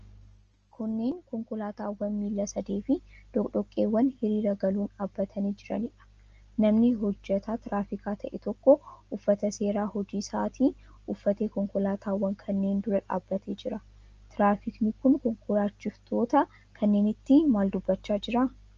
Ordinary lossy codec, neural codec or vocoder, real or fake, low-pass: Opus, 24 kbps; none; real; 7.2 kHz